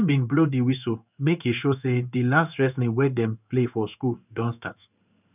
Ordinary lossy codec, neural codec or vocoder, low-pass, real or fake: none; codec, 16 kHz in and 24 kHz out, 1 kbps, XY-Tokenizer; 3.6 kHz; fake